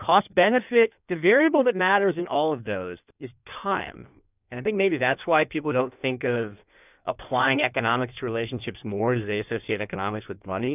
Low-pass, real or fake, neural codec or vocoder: 3.6 kHz; fake; codec, 16 kHz in and 24 kHz out, 1.1 kbps, FireRedTTS-2 codec